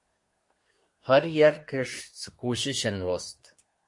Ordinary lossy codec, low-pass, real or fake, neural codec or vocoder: MP3, 48 kbps; 10.8 kHz; fake; codec, 24 kHz, 1 kbps, SNAC